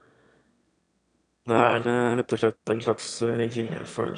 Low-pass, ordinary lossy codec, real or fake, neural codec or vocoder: 9.9 kHz; none; fake; autoencoder, 22.05 kHz, a latent of 192 numbers a frame, VITS, trained on one speaker